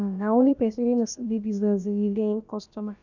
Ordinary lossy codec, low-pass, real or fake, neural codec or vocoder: none; 7.2 kHz; fake; codec, 16 kHz, about 1 kbps, DyCAST, with the encoder's durations